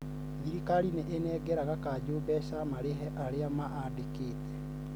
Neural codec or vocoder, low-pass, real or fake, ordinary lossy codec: none; none; real; none